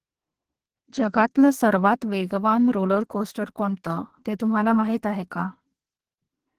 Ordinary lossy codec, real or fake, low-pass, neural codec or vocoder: Opus, 16 kbps; fake; 14.4 kHz; codec, 44.1 kHz, 2.6 kbps, SNAC